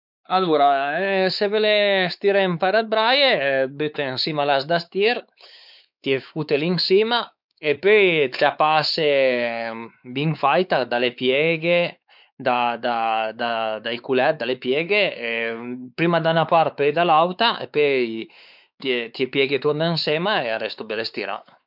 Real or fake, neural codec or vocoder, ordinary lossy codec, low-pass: fake; codec, 16 kHz, 4 kbps, X-Codec, WavLM features, trained on Multilingual LibriSpeech; none; 5.4 kHz